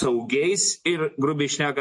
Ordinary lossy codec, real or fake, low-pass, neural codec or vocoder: MP3, 48 kbps; real; 10.8 kHz; none